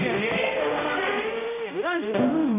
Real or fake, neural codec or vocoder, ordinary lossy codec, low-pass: fake; codec, 16 kHz, 0.5 kbps, X-Codec, HuBERT features, trained on general audio; none; 3.6 kHz